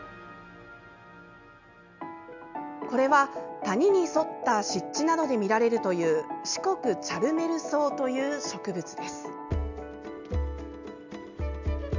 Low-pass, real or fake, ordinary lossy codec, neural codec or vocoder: 7.2 kHz; real; none; none